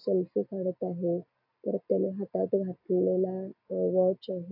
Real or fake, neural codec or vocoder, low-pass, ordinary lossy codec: real; none; 5.4 kHz; AAC, 48 kbps